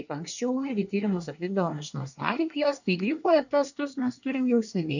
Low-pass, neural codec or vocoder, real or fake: 7.2 kHz; codec, 24 kHz, 1 kbps, SNAC; fake